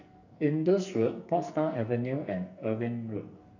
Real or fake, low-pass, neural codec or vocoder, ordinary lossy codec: fake; 7.2 kHz; codec, 44.1 kHz, 2.6 kbps, SNAC; none